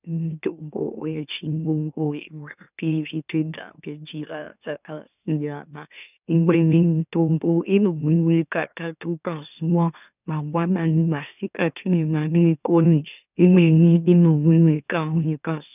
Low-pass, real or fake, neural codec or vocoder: 3.6 kHz; fake; autoencoder, 44.1 kHz, a latent of 192 numbers a frame, MeloTTS